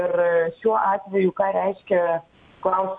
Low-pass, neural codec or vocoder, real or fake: 9.9 kHz; none; real